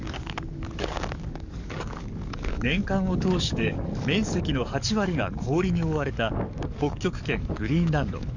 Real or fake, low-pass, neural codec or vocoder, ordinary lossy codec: fake; 7.2 kHz; codec, 44.1 kHz, 7.8 kbps, DAC; none